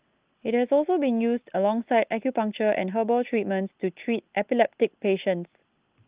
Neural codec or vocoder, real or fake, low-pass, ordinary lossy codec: none; real; 3.6 kHz; Opus, 32 kbps